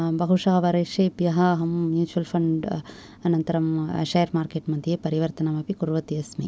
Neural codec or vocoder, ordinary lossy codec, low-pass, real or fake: none; none; none; real